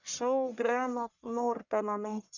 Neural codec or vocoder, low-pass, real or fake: codec, 44.1 kHz, 1.7 kbps, Pupu-Codec; 7.2 kHz; fake